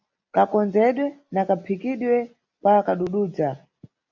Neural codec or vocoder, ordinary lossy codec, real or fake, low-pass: none; AAC, 48 kbps; real; 7.2 kHz